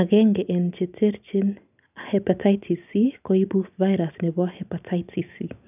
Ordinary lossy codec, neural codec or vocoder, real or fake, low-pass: none; none; real; 3.6 kHz